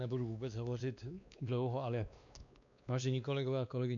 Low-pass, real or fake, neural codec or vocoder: 7.2 kHz; fake; codec, 16 kHz, 2 kbps, X-Codec, WavLM features, trained on Multilingual LibriSpeech